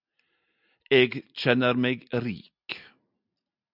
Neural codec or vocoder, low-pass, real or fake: none; 5.4 kHz; real